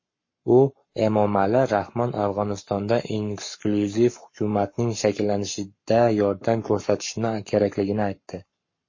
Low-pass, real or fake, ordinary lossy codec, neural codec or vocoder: 7.2 kHz; fake; MP3, 32 kbps; codec, 44.1 kHz, 7.8 kbps, Pupu-Codec